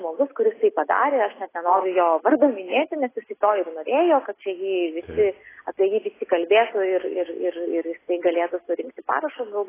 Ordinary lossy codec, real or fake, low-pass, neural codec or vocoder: AAC, 16 kbps; real; 3.6 kHz; none